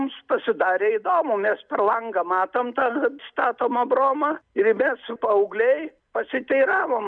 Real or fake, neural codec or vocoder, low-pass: real; none; 9.9 kHz